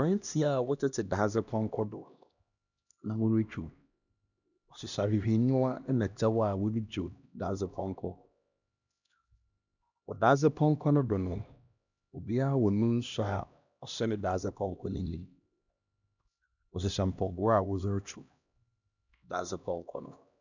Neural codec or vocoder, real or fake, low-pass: codec, 16 kHz, 1 kbps, X-Codec, HuBERT features, trained on LibriSpeech; fake; 7.2 kHz